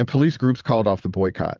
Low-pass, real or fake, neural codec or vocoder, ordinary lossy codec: 7.2 kHz; fake; vocoder, 22.05 kHz, 80 mel bands, WaveNeXt; Opus, 24 kbps